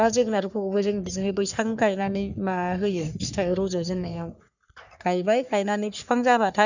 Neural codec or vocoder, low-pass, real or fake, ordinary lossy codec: codec, 44.1 kHz, 3.4 kbps, Pupu-Codec; 7.2 kHz; fake; none